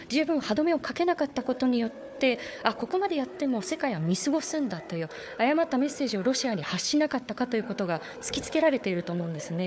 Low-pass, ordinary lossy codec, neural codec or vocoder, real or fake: none; none; codec, 16 kHz, 4 kbps, FunCodec, trained on Chinese and English, 50 frames a second; fake